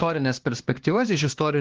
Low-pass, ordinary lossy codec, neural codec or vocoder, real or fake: 7.2 kHz; Opus, 16 kbps; codec, 16 kHz, 2 kbps, X-Codec, HuBERT features, trained on LibriSpeech; fake